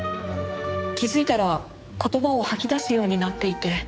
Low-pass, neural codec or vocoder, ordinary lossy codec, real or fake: none; codec, 16 kHz, 4 kbps, X-Codec, HuBERT features, trained on general audio; none; fake